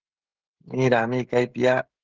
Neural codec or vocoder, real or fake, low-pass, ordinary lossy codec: codec, 16 kHz, 16 kbps, FreqCodec, smaller model; fake; 7.2 kHz; Opus, 16 kbps